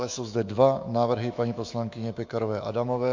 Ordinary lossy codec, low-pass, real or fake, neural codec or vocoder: MP3, 48 kbps; 7.2 kHz; fake; autoencoder, 48 kHz, 128 numbers a frame, DAC-VAE, trained on Japanese speech